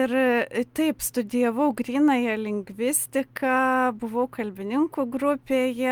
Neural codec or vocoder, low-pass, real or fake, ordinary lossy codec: none; 19.8 kHz; real; Opus, 32 kbps